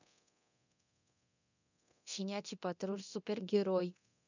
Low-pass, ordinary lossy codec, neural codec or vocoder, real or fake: 7.2 kHz; none; codec, 24 kHz, 0.9 kbps, DualCodec; fake